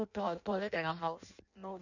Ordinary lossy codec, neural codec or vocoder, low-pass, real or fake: none; codec, 16 kHz in and 24 kHz out, 0.6 kbps, FireRedTTS-2 codec; 7.2 kHz; fake